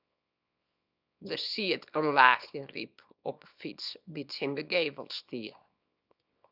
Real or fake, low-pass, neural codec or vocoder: fake; 5.4 kHz; codec, 24 kHz, 0.9 kbps, WavTokenizer, small release